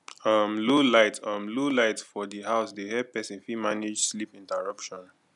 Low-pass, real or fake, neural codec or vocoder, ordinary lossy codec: 10.8 kHz; real; none; none